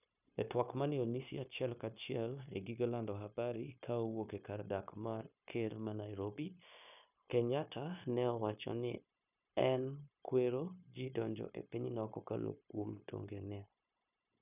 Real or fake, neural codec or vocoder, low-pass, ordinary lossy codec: fake; codec, 16 kHz, 0.9 kbps, LongCat-Audio-Codec; 3.6 kHz; none